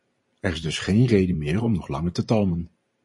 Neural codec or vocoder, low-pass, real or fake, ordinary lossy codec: vocoder, 44.1 kHz, 128 mel bands every 512 samples, BigVGAN v2; 10.8 kHz; fake; MP3, 48 kbps